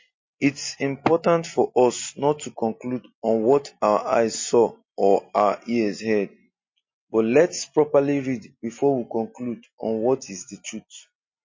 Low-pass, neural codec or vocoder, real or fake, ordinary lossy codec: 7.2 kHz; none; real; MP3, 32 kbps